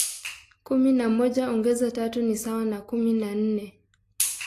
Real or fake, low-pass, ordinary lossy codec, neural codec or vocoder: real; 14.4 kHz; AAC, 48 kbps; none